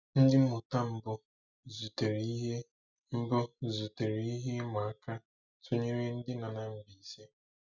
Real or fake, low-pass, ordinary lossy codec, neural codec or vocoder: real; 7.2 kHz; none; none